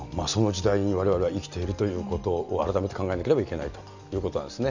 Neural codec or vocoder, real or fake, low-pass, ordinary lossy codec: none; real; 7.2 kHz; none